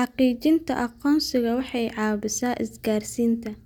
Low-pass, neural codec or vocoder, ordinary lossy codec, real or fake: 19.8 kHz; none; none; real